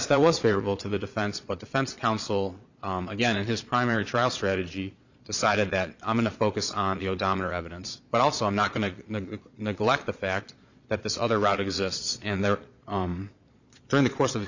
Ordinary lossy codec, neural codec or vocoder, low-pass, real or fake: Opus, 64 kbps; vocoder, 22.05 kHz, 80 mel bands, Vocos; 7.2 kHz; fake